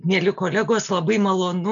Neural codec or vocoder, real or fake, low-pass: none; real; 7.2 kHz